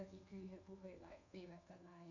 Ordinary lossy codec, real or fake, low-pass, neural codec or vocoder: none; fake; 7.2 kHz; codec, 24 kHz, 1.2 kbps, DualCodec